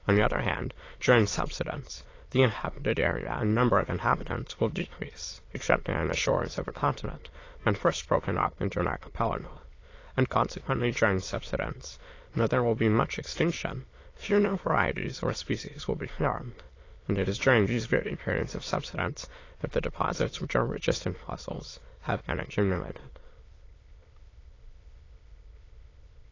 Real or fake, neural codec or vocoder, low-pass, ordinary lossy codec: fake; autoencoder, 22.05 kHz, a latent of 192 numbers a frame, VITS, trained on many speakers; 7.2 kHz; AAC, 32 kbps